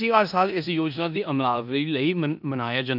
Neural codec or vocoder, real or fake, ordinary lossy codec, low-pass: codec, 16 kHz in and 24 kHz out, 0.9 kbps, LongCat-Audio-Codec, four codebook decoder; fake; none; 5.4 kHz